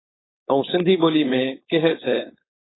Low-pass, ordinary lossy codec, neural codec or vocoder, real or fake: 7.2 kHz; AAC, 16 kbps; codec, 16 kHz, 4.8 kbps, FACodec; fake